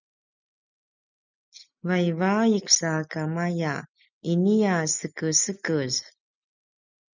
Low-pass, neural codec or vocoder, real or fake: 7.2 kHz; none; real